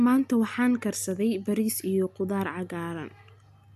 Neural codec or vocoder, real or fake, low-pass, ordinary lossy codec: none; real; 14.4 kHz; none